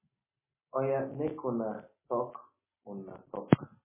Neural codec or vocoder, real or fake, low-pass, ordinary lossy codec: none; real; 3.6 kHz; MP3, 16 kbps